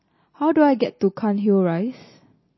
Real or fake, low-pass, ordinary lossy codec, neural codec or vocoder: real; 7.2 kHz; MP3, 24 kbps; none